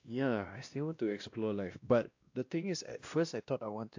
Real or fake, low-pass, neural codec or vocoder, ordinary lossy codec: fake; 7.2 kHz; codec, 16 kHz, 1 kbps, X-Codec, WavLM features, trained on Multilingual LibriSpeech; AAC, 48 kbps